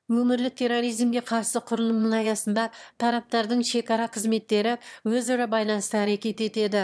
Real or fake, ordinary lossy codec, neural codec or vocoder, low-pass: fake; none; autoencoder, 22.05 kHz, a latent of 192 numbers a frame, VITS, trained on one speaker; none